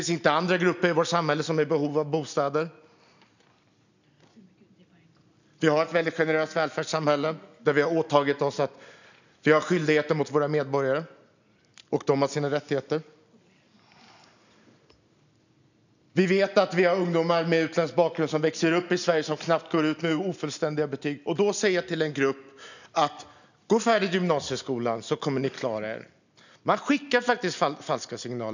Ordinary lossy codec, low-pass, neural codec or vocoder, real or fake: none; 7.2 kHz; none; real